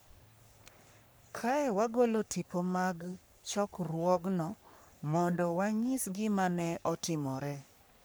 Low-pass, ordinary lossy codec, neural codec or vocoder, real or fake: none; none; codec, 44.1 kHz, 3.4 kbps, Pupu-Codec; fake